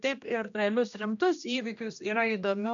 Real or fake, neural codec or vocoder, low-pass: fake; codec, 16 kHz, 1 kbps, X-Codec, HuBERT features, trained on general audio; 7.2 kHz